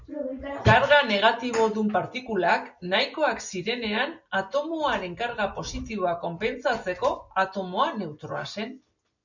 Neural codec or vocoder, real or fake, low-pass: none; real; 7.2 kHz